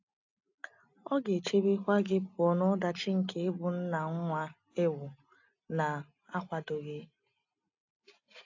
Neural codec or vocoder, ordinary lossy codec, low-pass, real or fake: none; AAC, 48 kbps; 7.2 kHz; real